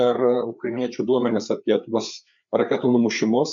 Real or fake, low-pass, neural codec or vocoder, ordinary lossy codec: fake; 7.2 kHz; codec, 16 kHz, 4 kbps, FreqCodec, larger model; MP3, 64 kbps